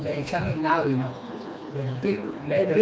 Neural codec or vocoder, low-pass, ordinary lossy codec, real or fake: codec, 16 kHz, 2 kbps, FreqCodec, smaller model; none; none; fake